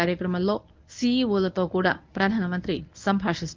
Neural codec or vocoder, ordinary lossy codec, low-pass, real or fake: codec, 24 kHz, 0.9 kbps, WavTokenizer, medium speech release version 1; Opus, 32 kbps; 7.2 kHz; fake